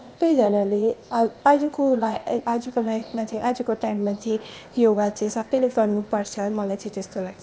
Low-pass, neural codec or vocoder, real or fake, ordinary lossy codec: none; codec, 16 kHz, 0.8 kbps, ZipCodec; fake; none